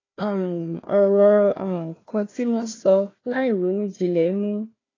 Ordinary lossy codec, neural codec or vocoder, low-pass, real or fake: AAC, 32 kbps; codec, 16 kHz, 1 kbps, FunCodec, trained on Chinese and English, 50 frames a second; 7.2 kHz; fake